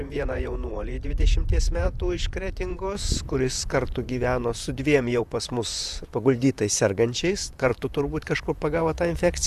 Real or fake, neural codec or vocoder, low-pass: fake; vocoder, 44.1 kHz, 128 mel bands, Pupu-Vocoder; 14.4 kHz